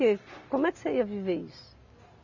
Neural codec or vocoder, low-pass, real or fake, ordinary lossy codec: none; 7.2 kHz; real; none